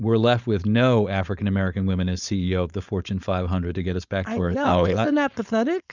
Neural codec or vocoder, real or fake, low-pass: codec, 16 kHz, 4.8 kbps, FACodec; fake; 7.2 kHz